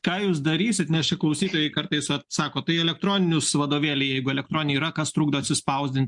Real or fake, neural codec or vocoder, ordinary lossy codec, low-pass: real; none; MP3, 64 kbps; 10.8 kHz